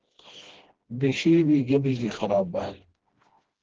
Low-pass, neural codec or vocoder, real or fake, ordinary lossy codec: 7.2 kHz; codec, 16 kHz, 1 kbps, FreqCodec, smaller model; fake; Opus, 16 kbps